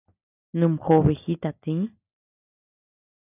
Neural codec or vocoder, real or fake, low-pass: none; real; 3.6 kHz